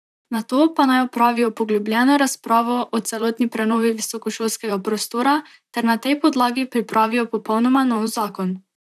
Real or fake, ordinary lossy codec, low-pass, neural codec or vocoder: fake; none; 14.4 kHz; vocoder, 44.1 kHz, 128 mel bands, Pupu-Vocoder